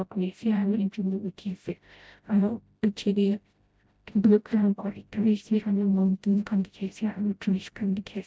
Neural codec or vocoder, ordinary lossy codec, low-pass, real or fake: codec, 16 kHz, 0.5 kbps, FreqCodec, smaller model; none; none; fake